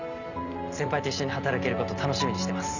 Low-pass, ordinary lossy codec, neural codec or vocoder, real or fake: 7.2 kHz; none; none; real